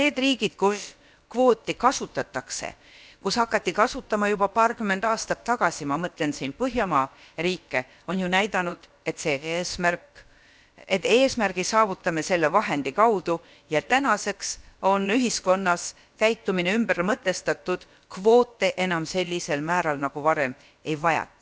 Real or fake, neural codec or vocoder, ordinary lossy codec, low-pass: fake; codec, 16 kHz, about 1 kbps, DyCAST, with the encoder's durations; none; none